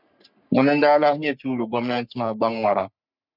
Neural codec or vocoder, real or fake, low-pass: codec, 44.1 kHz, 3.4 kbps, Pupu-Codec; fake; 5.4 kHz